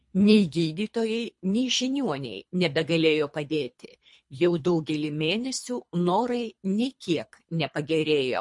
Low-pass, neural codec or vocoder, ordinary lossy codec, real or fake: 10.8 kHz; codec, 24 kHz, 3 kbps, HILCodec; MP3, 48 kbps; fake